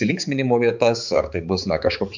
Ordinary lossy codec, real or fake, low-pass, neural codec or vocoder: MP3, 64 kbps; fake; 7.2 kHz; codec, 16 kHz, 4 kbps, X-Codec, HuBERT features, trained on balanced general audio